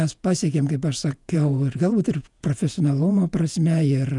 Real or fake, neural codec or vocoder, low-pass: fake; vocoder, 48 kHz, 128 mel bands, Vocos; 10.8 kHz